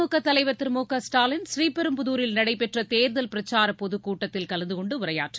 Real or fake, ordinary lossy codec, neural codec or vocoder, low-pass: real; none; none; none